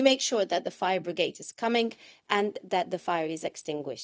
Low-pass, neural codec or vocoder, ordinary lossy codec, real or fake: none; codec, 16 kHz, 0.4 kbps, LongCat-Audio-Codec; none; fake